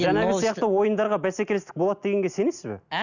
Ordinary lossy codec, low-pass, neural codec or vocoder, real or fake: none; 7.2 kHz; none; real